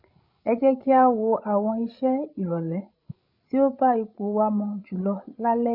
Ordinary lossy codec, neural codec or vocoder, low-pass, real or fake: none; codec, 16 kHz, 16 kbps, FreqCodec, larger model; 5.4 kHz; fake